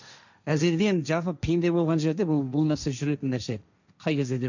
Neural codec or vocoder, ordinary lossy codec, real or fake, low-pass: codec, 16 kHz, 1.1 kbps, Voila-Tokenizer; none; fake; 7.2 kHz